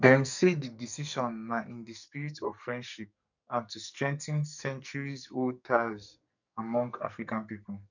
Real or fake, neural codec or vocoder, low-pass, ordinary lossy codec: fake; codec, 44.1 kHz, 2.6 kbps, SNAC; 7.2 kHz; none